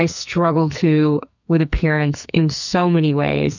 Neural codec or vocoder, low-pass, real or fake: codec, 44.1 kHz, 2.6 kbps, SNAC; 7.2 kHz; fake